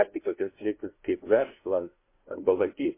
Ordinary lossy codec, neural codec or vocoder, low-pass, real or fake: MP3, 24 kbps; codec, 16 kHz, 0.5 kbps, FunCodec, trained on LibriTTS, 25 frames a second; 3.6 kHz; fake